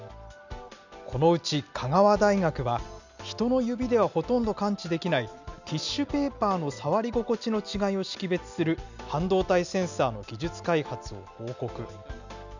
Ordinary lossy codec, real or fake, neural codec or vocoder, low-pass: none; real; none; 7.2 kHz